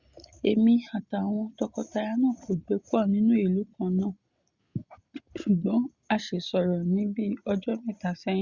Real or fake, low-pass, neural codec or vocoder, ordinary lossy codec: real; 7.2 kHz; none; Opus, 64 kbps